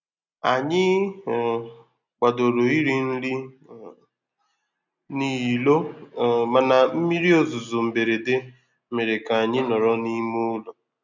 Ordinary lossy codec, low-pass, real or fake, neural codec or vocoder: none; 7.2 kHz; real; none